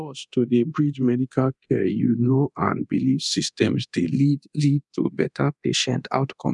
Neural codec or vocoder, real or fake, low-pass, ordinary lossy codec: codec, 24 kHz, 0.9 kbps, DualCodec; fake; 10.8 kHz; none